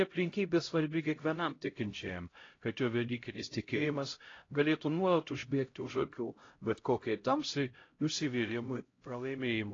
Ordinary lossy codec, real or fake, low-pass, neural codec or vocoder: AAC, 32 kbps; fake; 7.2 kHz; codec, 16 kHz, 0.5 kbps, X-Codec, HuBERT features, trained on LibriSpeech